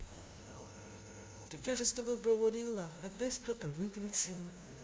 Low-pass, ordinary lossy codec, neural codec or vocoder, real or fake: none; none; codec, 16 kHz, 0.5 kbps, FunCodec, trained on LibriTTS, 25 frames a second; fake